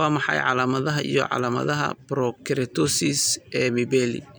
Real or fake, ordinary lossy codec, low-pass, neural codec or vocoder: real; none; none; none